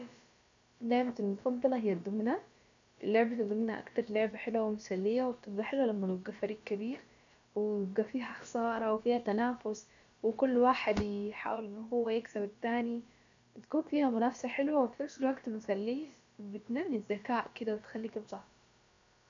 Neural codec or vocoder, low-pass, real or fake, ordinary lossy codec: codec, 16 kHz, about 1 kbps, DyCAST, with the encoder's durations; 7.2 kHz; fake; AAC, 64 kbps